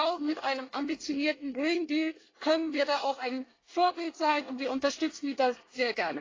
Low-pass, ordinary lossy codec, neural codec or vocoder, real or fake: 7.2 kHz; AAC, 32 kbps; codec, 24 kHz, 1 kbps, SNAC; fake